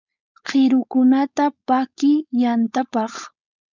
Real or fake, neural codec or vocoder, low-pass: fake; codec, 16 kHz, 4.8 kbps, FACodec; 7.2 kHz